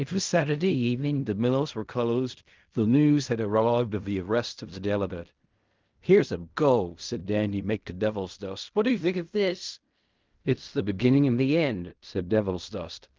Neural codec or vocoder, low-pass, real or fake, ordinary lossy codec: codec, 16 kHz in and 24 kHz out, 0.4 kbps, LongCat-Audio-Codec, fine tuned four codebook decoder; 7.2 kHz; fake; Opus, 24 kbps